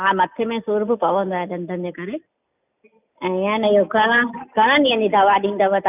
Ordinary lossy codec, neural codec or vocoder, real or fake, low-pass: none; none; real; 3.6 kHz